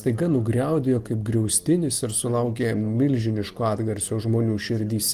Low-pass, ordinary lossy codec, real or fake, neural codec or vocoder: 14.4 kHz; Opus, 24 kbps; real; none